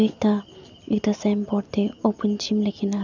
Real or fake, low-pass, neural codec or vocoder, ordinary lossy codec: real; 7.2 kHz; none; none